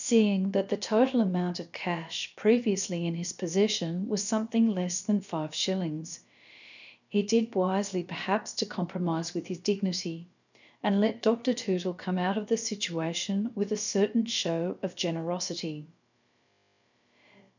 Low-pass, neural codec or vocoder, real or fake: 7.2 kHz; codec, 16 kHz, about 1 kbps, DyCAST, with the encoder's durations; fake